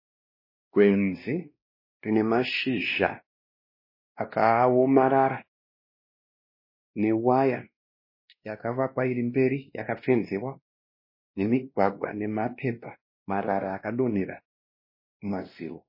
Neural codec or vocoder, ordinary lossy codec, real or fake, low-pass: codec, 16 kHz, 1 kbps, X-Codec, WavLM features, trained on Multilingual LibriSpeech; MP3, 24 kbps; fake; 5.4 kHz